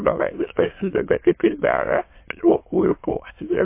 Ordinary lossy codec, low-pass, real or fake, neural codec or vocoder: MP3, 24 kbps; 3.6 kHz; fake; autoencoder, 22.05 kHz, a latent of 192 numbers a frame, VITS, trained on many speakers